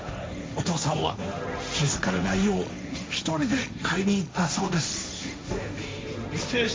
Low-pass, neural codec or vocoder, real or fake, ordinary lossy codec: none; codec, 16 kHz, 1.1 kbps, Voila-Tokenizer; fake; none